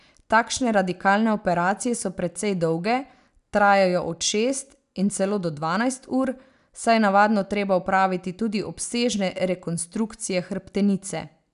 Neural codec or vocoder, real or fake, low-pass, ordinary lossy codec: none; real; 10.8 kHz; none